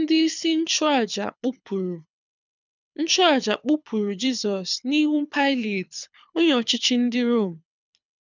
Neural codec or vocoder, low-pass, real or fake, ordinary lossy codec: codec, 24 kHz, 6 kbps, HILCodec; 7.2 kHz; fake; none